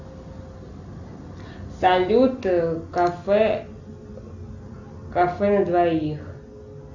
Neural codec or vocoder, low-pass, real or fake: none; 7.2 kHz; real